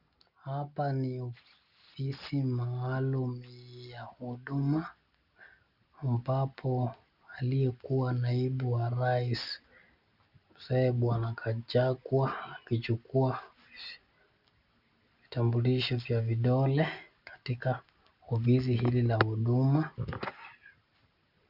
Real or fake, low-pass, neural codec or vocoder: real; 5.4 kHz; none